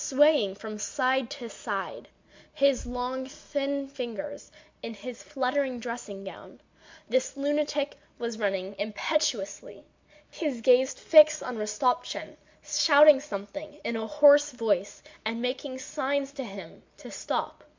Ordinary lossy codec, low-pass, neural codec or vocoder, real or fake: MP3, 64 kbps; 7.2 kHz; none; real